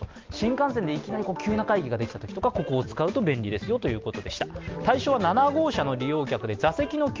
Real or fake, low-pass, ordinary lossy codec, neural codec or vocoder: real; 7.2 kHz; Opus, 24 kbps; none